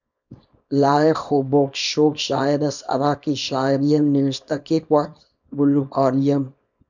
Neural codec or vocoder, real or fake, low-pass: codec, 24 kHz, 0.9 kbps, WavTokenizer, small release; fake; 7.2 kHz